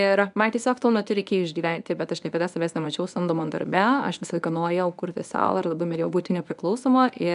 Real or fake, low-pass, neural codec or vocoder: fake; 10.8 kHz; codec, 24 kHz, 0.9 kbps, WavTokenizer, medium speech release version 1